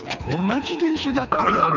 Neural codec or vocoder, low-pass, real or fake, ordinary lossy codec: codec, 24 kHz, 3 kbps, HILCodec; 7.2 kHz; fake; none